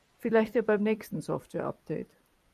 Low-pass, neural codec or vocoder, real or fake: 14.4 kHz; vocoder, 44.1 kHz, 128 mel bands every 256 samples, BigVGAN v2; fake